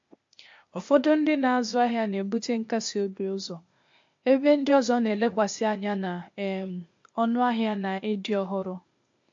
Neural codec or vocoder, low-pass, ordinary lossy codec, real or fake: codec, 16 kHz, 0.8 kbps, ZipCodec; 7.2 kHz; MP3, 48 kbps; fake